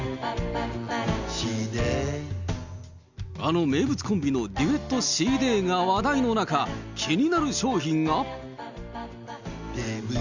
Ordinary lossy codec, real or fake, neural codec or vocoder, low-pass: Opus, 64 kbps; real; none; 7.2 kHz